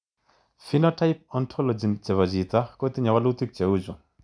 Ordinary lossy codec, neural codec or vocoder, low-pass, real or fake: none; none; 9.9 kHz; real